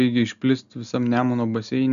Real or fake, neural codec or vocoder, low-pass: real; none; 7.2 kHz